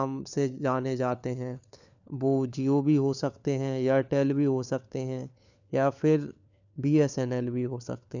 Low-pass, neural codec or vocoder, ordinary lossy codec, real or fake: 7.2 kHz; codec, 16 kHz, 4 kbps, FunCodec, trained on LibriTTS, 50 frames a second; none; fake